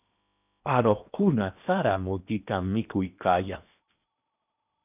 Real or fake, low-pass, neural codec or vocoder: fake; 3.6 kHz; codec, 16 kHz in and 24 kHz out, 0.8 kbps, FocalCodec, streaming, 65536 codes